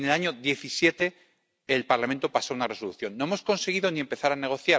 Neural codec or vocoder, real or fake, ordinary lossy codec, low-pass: none; real; none; none